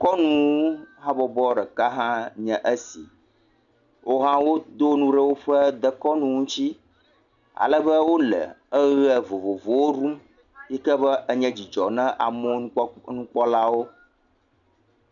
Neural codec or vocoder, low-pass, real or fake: none; 7.2 kHz; real